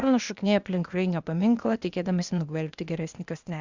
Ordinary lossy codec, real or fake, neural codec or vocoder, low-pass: Opus, 64 kbps; fake; codec, 16 kHz, 0.8 kbps, ZipCodec; 7.2 kHz